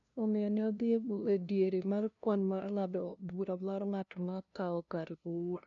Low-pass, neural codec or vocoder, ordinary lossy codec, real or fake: 7.2 kHz; codec, 16 kHz, 0.5 kbps, FunCodec, trained on LibriTTS, 25 frames a second; none; fake